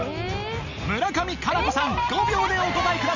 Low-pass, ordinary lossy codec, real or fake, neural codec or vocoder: 7.2 kHz; none; real; none